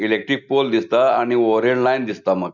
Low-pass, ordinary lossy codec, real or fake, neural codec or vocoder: 7.2 kHz; none; real; none